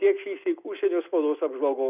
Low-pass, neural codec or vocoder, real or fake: 3.6 kHz; none; real